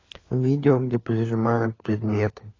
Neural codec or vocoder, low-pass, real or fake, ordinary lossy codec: codec, 16 kHz, 4 kbps, FunCodec, trained on LibriTTS, 50 frames a second; 7.2 kHz; fake; AAC, 32 kbps